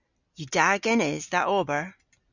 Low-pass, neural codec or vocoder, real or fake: 7.2 kHz; none; real